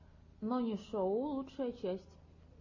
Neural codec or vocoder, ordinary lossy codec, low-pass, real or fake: none; MP3, 32 kbps; 7.2 kHz; real